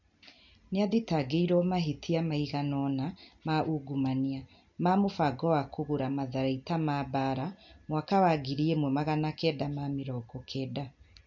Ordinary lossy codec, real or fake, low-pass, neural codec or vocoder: none; real; 7.2 kHz; none